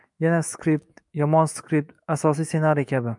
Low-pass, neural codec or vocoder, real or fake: 10.8 kHz; autoencoder, 48 kHz, 128 numbers a frame, DAC-VAE, trained on Japanese speech; fake